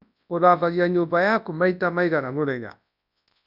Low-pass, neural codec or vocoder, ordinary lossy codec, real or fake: 5.4 kHz; codec, 24 kHz, 0.9 kbps, WavTokenizer, large speech release; none; fake